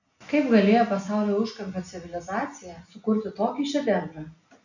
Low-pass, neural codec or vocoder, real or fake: 7.2 kHz; none; real